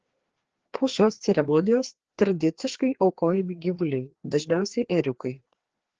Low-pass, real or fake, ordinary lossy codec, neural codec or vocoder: 7.2 kHz; fake; Opus, 16 kbps; codec, 16 kHz, 2 kbps, FreqCodec, larger model